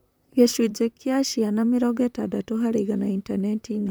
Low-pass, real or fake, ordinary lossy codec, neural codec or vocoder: none; fake; none; vocoder, 44.1 kHz, 128 mel bands, Pupu-Vocoder